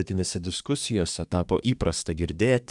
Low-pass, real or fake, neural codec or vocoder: 10.8 kHz; fake; codec, 24 kHz, 1 kbps, SNAC